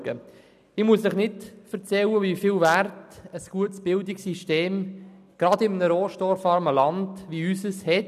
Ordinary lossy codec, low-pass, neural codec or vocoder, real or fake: none; 14.4 kHz; none; real